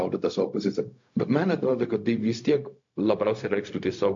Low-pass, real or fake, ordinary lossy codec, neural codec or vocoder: 7.2 kHz; fake; AAC, 48 kbps; codec, 16 kHz, 0.4 kbps, LongCat-Audio-Codec